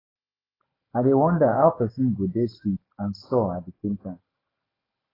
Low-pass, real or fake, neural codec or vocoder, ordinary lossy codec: 5.4 kHz; real; none; AAC, 24 kbps